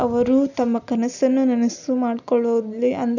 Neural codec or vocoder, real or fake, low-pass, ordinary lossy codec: none; real; 7.2 kHz; none